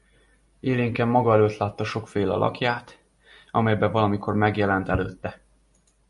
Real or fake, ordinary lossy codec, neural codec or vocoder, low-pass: real; MP3, 48 kbps; none; 14.4 kHz